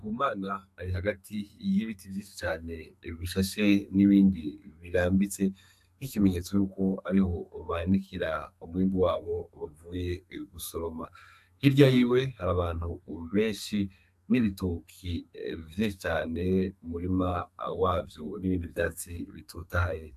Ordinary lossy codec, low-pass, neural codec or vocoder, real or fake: AAC, 96 kbps; 14.4 kHz; codec, 32 kHz, 1.9 kbps, SNAC; fake